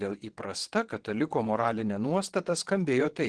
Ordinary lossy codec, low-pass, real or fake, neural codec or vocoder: Opus, 16 kbps; 9.9 kHz; fake; vocoder, 22.05 kHz, 80 mel bands, WaveNeXt